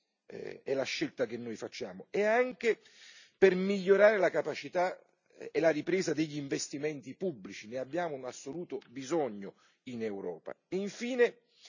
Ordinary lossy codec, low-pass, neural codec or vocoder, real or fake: none; 7.2 kHz; none; real